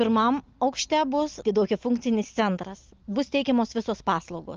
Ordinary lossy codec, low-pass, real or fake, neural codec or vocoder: Opus, 24 kbps; 7.2 kHz; real; none